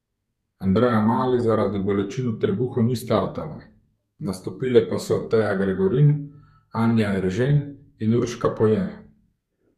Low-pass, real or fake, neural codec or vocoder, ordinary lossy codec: 14.4 kHz; fake; codec, 32 kHz, 1.9 kbps, SNAC; none